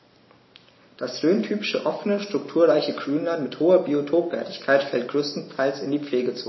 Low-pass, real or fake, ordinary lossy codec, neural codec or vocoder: 7.2 kHz; real; MP3, 24 kbps; none